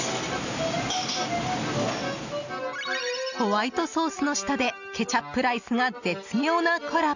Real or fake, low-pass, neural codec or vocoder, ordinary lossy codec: real; 7.2 kHz; none; none